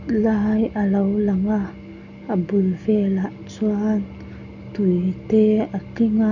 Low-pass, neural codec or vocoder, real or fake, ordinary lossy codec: 7.2 kHz; none; real; none